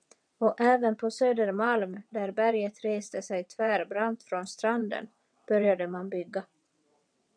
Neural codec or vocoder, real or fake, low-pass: vocoder, 22.05 kHz, 80 mel bands, WaveNeXt; fake; 9.9 kHz